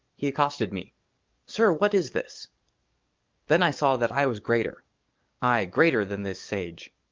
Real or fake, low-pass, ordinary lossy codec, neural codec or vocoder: fake; 7.2 kHz; Opus, 24 kbps; codec, 44.1 kHz, 7.8 kbps, DAC